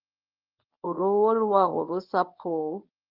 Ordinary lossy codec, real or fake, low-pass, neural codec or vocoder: Opus, 24 kbps; fake; 5.4 kHz; codec, 24 kHz, 0.9 kbps, WavTokenizer, medium speech release version 1